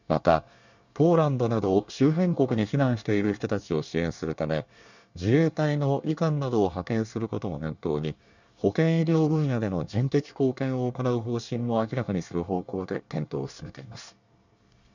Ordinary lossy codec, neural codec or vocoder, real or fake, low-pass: none; codec, 24 kHz, 1 kbps, SNAC; fake; 7.2 kHz